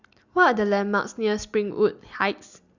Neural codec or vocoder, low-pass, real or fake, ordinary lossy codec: none; 7.2 kHz; real; Opus, 64 kbps